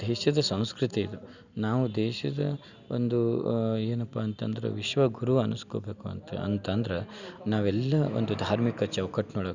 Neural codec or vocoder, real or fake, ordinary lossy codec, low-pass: none; real; none; 7.2 kHz